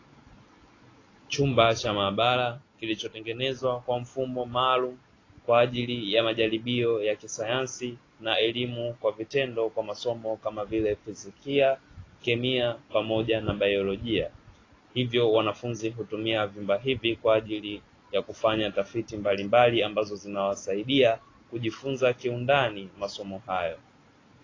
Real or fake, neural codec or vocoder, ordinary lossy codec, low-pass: real; none; AAC, 32 kbps; 7.2 kHz